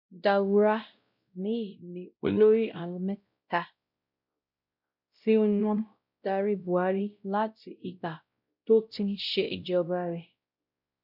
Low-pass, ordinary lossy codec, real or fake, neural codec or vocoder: 5.4 kHz; none; fake; codec, 16 kHz, 0.5 kbps, X-Codec, WavLM features, trained on Multilingual LibriSpeech